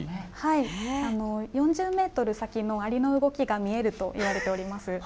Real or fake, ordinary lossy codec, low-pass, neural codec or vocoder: real; none; none; none